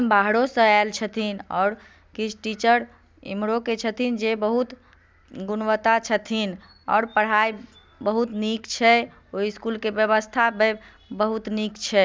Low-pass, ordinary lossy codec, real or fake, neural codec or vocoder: none; none; real; none